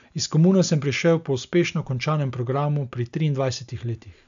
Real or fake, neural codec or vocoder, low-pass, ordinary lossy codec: real; none; 7.2 kHz; AAC, 96 kbps